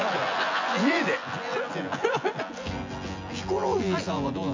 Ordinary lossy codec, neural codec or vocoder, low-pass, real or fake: MP3, 48 kbps; vocoder, 24 kHz, 100 mel bands, Vocos; 7.2 kHz; fake